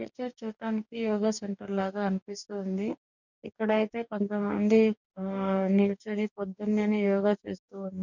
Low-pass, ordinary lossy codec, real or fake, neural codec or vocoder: 7.2 kHz; none; fake; codec, 44.1 kHz, 2.6 kbps, DAC